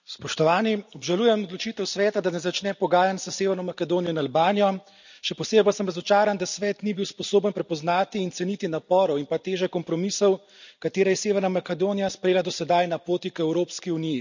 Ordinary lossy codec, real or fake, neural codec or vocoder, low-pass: none; real; none; 7.2 kHz